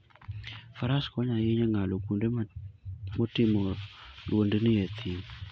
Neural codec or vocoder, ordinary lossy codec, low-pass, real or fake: none; none; none; real